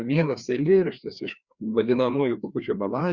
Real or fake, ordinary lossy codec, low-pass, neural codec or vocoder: fake; Opus, 64 kbps; 7.2 kHz; codec, 16 kHz, 2 kbps, FreqCodec, larger model